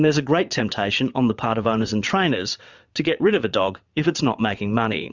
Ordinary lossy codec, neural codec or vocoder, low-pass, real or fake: Opus, 64 kbps; vocoder, 22.05 kHz, 80 mel bands, WaveNeXt; 7.2 kHz; fake